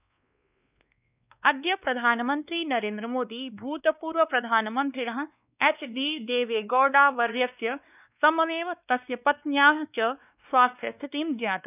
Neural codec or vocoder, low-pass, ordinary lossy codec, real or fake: codec, 16 kHz, 2 kbps, X-Codec, WavLM features, trained on Multilingual LibriSpeech; 3.6 kHz; none; fake